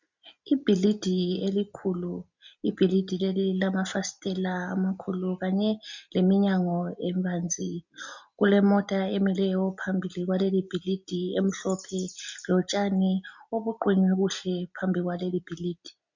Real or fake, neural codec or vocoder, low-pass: real; none; 7.2 kHz